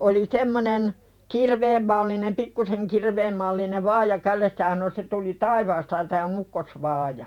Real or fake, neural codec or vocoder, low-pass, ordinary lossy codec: fake; vocoder, 48 kHz, 128 mel bands, Vocos; 19.8 kHz; none